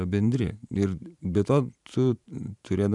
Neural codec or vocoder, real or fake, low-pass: vocoder, 44.1 kHz, 128 mel bands every 512 samples, BigVGAN v2; fake; 10.8 kHz